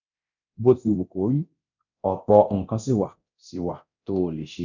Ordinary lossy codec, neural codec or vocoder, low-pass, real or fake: none; codec, 24 kHz, 0.9 kbps, DualCodec; 7.2 kHz; fake